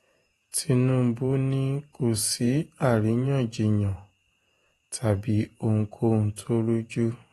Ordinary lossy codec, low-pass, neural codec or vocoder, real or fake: AAC, 32 kbps; 19.8 kHz; vocoder, 48 kHz, 128 mel bands, Vocos; fake